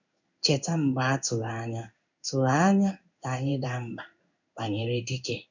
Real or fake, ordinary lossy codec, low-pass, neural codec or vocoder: fake; none; 7.2 kHz; codec, 16 kHz in and 24 kHz out, 1 kbps, XY-Tokenizer